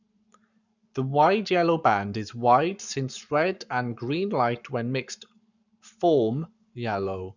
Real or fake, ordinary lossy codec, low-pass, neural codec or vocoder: fake; none; 7.2 kHz; codec, 44.1 kHz, 7.8 kbps, Pupu-Codec